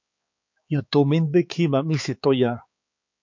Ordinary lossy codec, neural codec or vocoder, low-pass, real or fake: MP3, 48 kbps; codec, 16 kHz, 4 kbps, X-Codec, HuBERT features, trained on balanced general audio; 7.2 kHz; fake